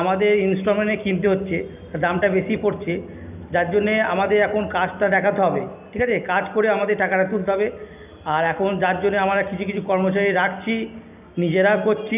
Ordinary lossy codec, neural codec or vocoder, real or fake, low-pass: none; none; real; 3.6 kHz